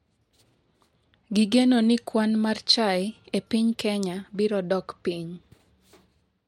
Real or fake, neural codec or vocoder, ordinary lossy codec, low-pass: real; none; MP3, 64 kbps; 19.8 kHz